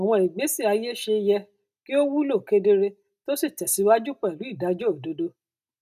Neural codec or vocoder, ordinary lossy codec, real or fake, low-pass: none; none; real; 14.4 kHz